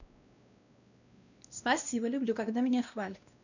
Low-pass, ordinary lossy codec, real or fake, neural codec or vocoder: 7.2 kHz; none; fake; codec, 16 kHz, 1 kbps, X-Codec, WavLM features, trained on Multilingual LibriSpeech